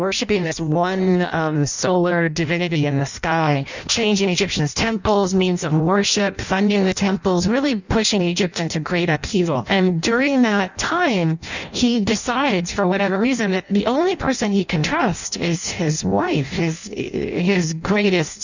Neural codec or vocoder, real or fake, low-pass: codec, 16 kHz in and 24 kHz out, 0.6 kbps, FireRedTTS-2 codec; fake; 7.2 kHz